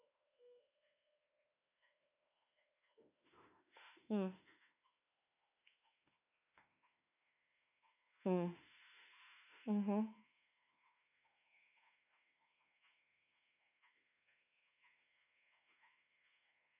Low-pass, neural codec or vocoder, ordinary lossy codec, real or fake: 3.6 kHz; autoencoder, 48 kHz, 32 numbers a frame, DAC-VAE, trained on Japanese speech; none; fake